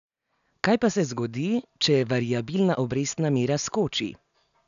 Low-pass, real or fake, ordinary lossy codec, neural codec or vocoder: 7.2 kHz; real; none; none